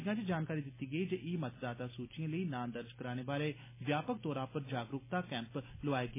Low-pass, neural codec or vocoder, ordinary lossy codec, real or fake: 3.6 kHz; none; MP3, 16 kbps; real